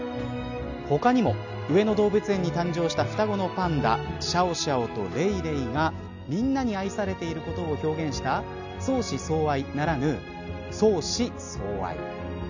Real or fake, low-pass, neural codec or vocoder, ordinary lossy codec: real; 7.2 kHz; none; none